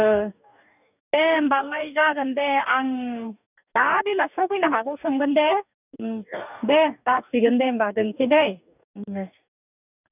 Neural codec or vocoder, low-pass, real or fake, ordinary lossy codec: codec, 44.1 kHz, 2.6 kbps, DAC; 3.6 kHz; fake; none